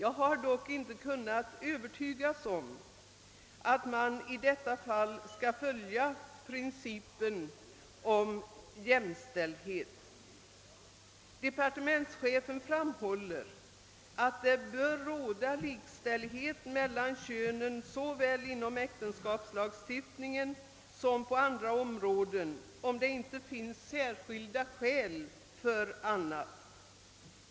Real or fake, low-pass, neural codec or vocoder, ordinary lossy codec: real; none; none; none